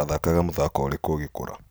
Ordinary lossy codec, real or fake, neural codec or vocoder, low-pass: none; real; none; none